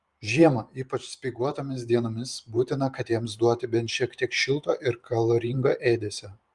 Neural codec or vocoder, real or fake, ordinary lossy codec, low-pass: vocoder, 44.1 kHz, 128 mel bands every 512 samples, BigVGAN v2; fake; Opus, 32 kbps; 10.8 kHz